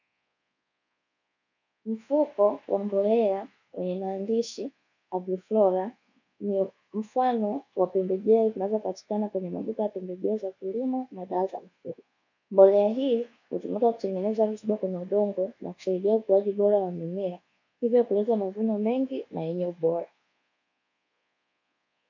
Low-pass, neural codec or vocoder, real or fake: 7.2 kHz; codec, 24 kHz, 1.2 kbps, DualCodec; fake